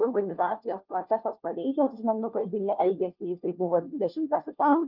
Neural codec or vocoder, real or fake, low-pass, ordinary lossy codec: codec, 16 kHz, 1 kbps, FunCodec, trained on LibriTTS, 50 frames a second; fake; 5.4 kHz; Opus, 24 kbps